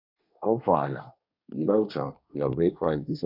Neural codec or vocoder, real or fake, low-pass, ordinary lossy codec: codec, 24 kHz, 1 kbps, SNAC; fake; 5.4 kHz; none